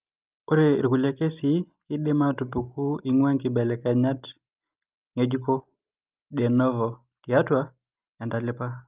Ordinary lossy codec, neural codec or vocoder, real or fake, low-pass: Opus, 32 kbps; none; real; 3.6 kHz